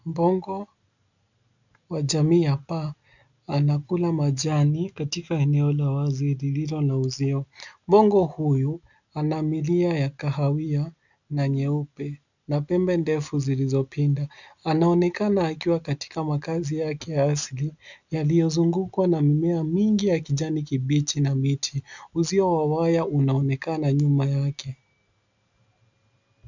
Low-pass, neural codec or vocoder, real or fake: 7.2 kHz; none; real